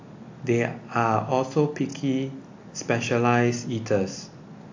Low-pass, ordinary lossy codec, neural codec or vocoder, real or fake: 7.2 kHz; AAC, 48 kbps; none; real